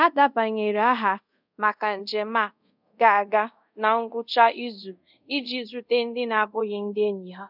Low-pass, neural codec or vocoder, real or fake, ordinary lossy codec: 5.4 kHz; codec, 24 kHz, 0.5 kbps, DualCodec; fake; none